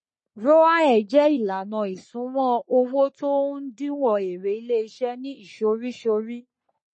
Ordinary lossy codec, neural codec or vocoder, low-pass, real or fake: MP3, 32 kbps; codec, 44.1 kHz, 3.4 kbps, Pupu-Codec; 10.8 kHz; fake